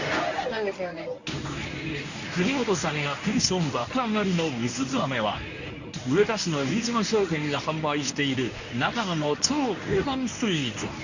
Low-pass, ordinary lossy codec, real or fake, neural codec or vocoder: 7.2 kHz; none; fake; codec, 24 kHz, 0.9 kbps, WavTokenizer, medium speech release version 1